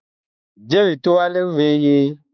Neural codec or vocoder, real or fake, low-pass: codec, 16 kHz, 4 kbps, X-Codec, HuBERT features, trained on balanced general audio; fake; 7.2 kHz